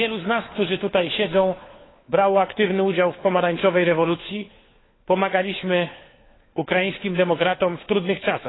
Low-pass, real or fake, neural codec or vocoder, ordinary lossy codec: 7.2 kHz; fake; codec, 16 kHz, 4 kbps, FunCodec, trained on LibriTTS, 50 frames a second; AAC, 16 kbps